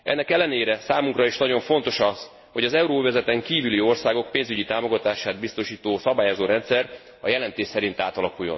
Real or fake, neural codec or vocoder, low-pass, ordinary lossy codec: real; none; 7.2 kHz; MP3, 24 kbps